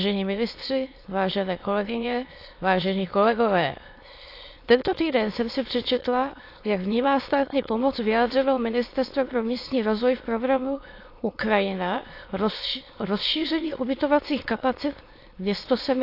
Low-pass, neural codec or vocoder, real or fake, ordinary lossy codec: 5.4 kHz; autoencoder, 22.05 kHz, a latent of 192 numbers a frame, VITS, trained on many speakers; fake; AAC, 32 kbps